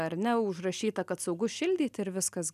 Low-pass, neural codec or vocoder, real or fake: 14.4 kHz; none; real